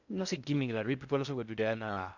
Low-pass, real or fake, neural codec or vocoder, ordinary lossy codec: 7.2 kHz; fake; codec, 16 kHz in and 24 kHz out, 0.6 kbps, FocalCodec, streaming, 2048 codes; none